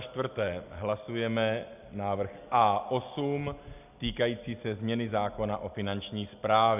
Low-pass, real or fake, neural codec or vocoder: 3.6 kHz; real; none